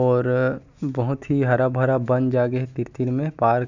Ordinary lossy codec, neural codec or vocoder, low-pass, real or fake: none; none; 7.2 kHz; real